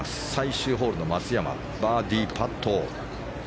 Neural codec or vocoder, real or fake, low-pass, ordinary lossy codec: none; real; none; none